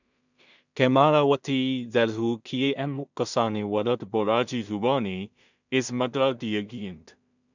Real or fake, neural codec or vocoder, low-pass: fake; codec, 16 kHz in and 24 kHz out, 0.4 kbps, LongCat-Audio-Codec, two codebook decoder; 7.2 kHz